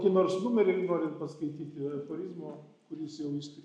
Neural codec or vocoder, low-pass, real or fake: none; 9.9 kHz; real